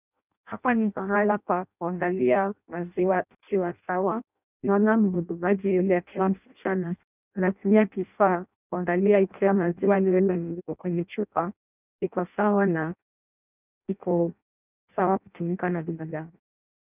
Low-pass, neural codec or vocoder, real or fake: 3.6 kHz; codec, 16 kHz in and 24 kHz out, 0.6 kbps, FireRedTTS-2 codec; fake